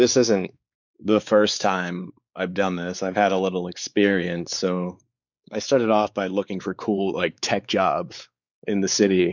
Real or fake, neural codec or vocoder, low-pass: fake; codec, 16 kHz, 4 kbps, X-Codec, WavLM features, trained on Multilingual LibriSpeech; 7.2 kHz